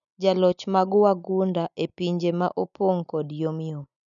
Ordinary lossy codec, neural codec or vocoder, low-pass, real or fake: none; none; 7.2 kHz; real